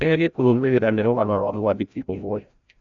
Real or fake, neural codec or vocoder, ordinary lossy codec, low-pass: fake; codec, 16 kHz, 0.5 kbps, FreqCodec, larger model; none; 7.2 kHz